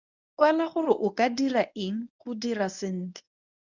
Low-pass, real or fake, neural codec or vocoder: 7.2 kHz; fake; codec, 24 kHz, 0.9 kbps, WavTokenizer, medium speech release version 1